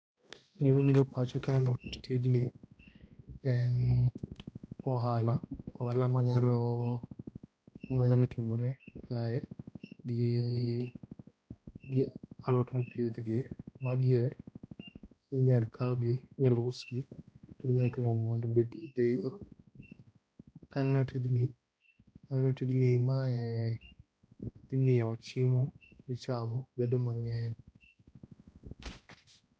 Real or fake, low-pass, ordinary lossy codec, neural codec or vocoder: fake; none; none; codec, 16 kHz, 1 kbps, X-Codec, HuBERT features, trained on balanced general audio